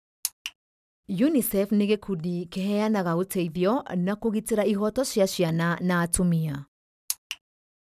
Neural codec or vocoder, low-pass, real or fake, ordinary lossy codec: none; 14.4 kHz; real; none